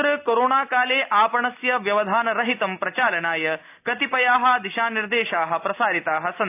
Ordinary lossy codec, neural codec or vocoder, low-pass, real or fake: AAC, 32 kbps; none; 3.6 kHz; real